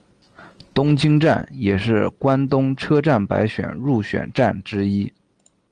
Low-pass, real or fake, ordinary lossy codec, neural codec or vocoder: 9.9 kHz; real; Opus, 24 kbps; none